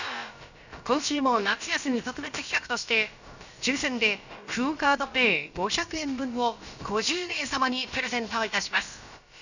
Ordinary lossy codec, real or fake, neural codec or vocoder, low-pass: none; fake; codec, 16 kHz, about 1 kbps, DyCAST, with the encoder's durations; 7.2 kHz